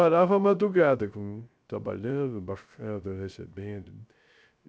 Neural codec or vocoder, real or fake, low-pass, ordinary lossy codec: codec, 16 kHz, 0.3 kbps, FocalCodec; fake; none; none